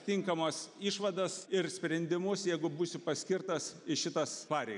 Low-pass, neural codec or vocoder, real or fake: 10.8 kHz; none; real